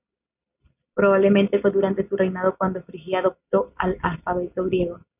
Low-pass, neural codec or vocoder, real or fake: 3.6 kHz; none; real